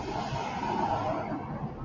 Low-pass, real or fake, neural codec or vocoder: 7.2 kHz; real; none